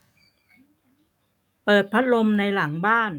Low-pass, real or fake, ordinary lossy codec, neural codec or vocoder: 19.8 kHz; fake; none; codec, 44.1 kHz, 7.8 kbps, DAC